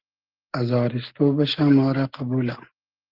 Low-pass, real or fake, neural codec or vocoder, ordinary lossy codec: 5.4 kHz; real; none; Opus, 16 kbps